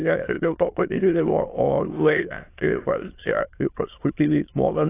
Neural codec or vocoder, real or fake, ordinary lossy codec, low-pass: autoencoder, 22.05 kHz, a latent of 192 numbers a frame, VITS, trained on many speakers; fake; AAC, 24 kbps; 3.6 kHz